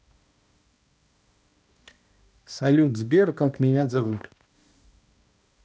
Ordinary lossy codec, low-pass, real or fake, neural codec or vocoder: none; none; fake; codec, 16 kHz, 1 kbps, X-Codec, HuBERT features, trained on balanced general audio